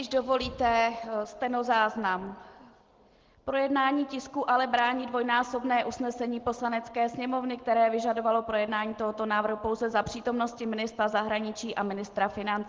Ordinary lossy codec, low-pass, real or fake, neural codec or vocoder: Opus, 16 kbps; 7.2 kHz; real; none